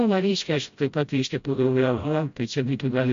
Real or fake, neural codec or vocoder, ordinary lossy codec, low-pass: fake; codec, 16 kHz, 0.5 kbps, FreqCodec, smaller model; MP3, 48 kbps; 7.2 kHz